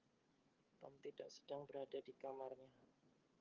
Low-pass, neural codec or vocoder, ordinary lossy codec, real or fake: 7.2 kHz; codec, 16 kHz, 8 kbps, FreqCodec, smaller model; Opus, 32 kbps; fake